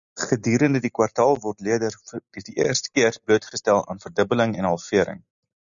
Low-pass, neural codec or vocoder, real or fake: 7.2 kHz; none; real